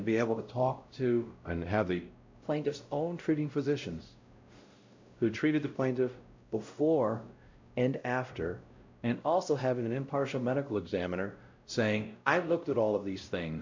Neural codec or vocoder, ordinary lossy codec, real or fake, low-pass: codec, 16 kHz, 0.5 kbps, X-Codec, WavLM features, trained on Multilingual LibriSpeech; MP3, 64 kbps; fake; 7.2 kHz